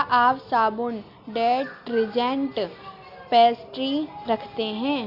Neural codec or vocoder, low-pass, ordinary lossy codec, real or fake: none; 5.4 kHz; Opus, 64 kbps; real